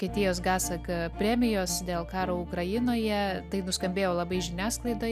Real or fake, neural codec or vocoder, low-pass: real; none; 14.4 kHz